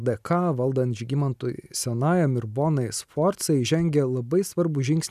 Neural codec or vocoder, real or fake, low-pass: none; real; 14.4 kHz